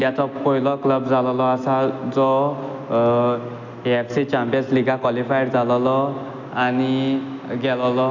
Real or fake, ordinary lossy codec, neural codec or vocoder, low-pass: real; none; none; 7.2 kHz